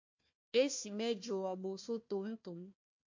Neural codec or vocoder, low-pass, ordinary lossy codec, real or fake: codec, 16 kHz, 1 kbps, FunCodec, trained on Chinese and English, 50 frames a second; 7.2 kHz; MP3, 48 kbps; fake